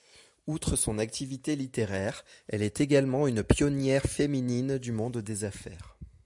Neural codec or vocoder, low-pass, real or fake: none; 10.8 kHz; real